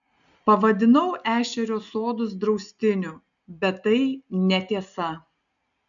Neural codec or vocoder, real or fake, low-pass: none; real; 7.2 kHz